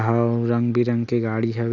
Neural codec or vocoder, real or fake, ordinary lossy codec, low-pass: none; real; none; 7.2 kHz